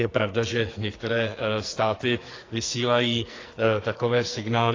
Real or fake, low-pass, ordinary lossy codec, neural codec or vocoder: fake; 7.2 kHz; AAC, 32 kbps; codec, 44.1 kHz, 2.6 kbps, SNAC